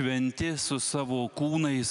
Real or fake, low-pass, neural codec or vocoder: real; 10.8 kHz; none